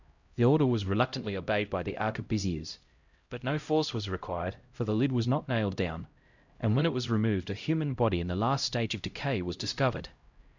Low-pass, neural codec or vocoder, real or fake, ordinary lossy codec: 7.2 kHz; codec, 16 kHz, 0.5 kbps, X-Codec, HuBERT features, trained on LibriSpeech; fake; Opus, 64 kbps